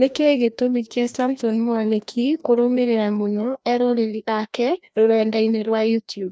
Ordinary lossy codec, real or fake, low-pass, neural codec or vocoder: none; fake; none; codec, 16 kHz, 1 kbps, FreqCodec, larger model